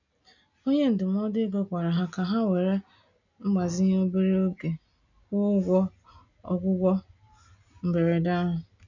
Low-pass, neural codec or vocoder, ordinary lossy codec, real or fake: 7.2 kHz; none; none; real